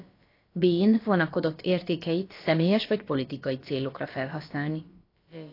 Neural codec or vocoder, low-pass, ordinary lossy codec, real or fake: codec, 16 kHz, about 1 kbps, DyCAST, with the encoder's durations; 5.4 kHz; AAC, 32 kbps; fake